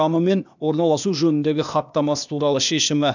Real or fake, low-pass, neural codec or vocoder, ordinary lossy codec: fake; 7.2 kHz; codec, 16 kHz, 0.8 kbps, ZipCodec; none